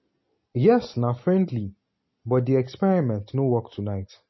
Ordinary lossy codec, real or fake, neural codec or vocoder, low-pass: MP3, 24 kbps; real; none; 7.2 kHz